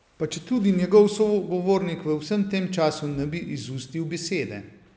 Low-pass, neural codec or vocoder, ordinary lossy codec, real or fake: none; none; none; real